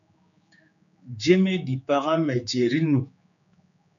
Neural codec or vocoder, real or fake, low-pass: codec, 16 kHz, 4 kbps, X-Codec, HuBERT features, trained on general audio; fake; 7.2 kHz